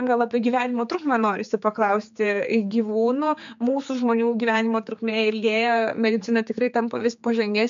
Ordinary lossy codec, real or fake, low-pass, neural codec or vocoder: MP3, 64 kbps; fake; 7.2 kHz; codec, 16 kHz, 4 kbps, X-Codec, HuBERT features, trained on general audio